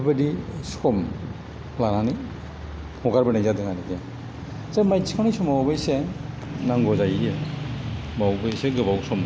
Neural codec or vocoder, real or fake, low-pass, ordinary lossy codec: none; real; none; none